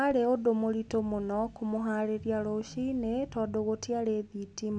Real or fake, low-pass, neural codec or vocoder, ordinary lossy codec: real; none; none; none